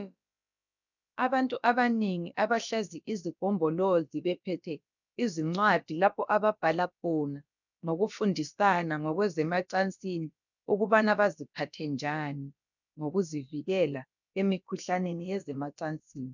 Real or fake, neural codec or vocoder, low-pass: fake; codec, 16 kHz, about 1 kbps, DyCAST, with the encoder's durations; 7.2 kHz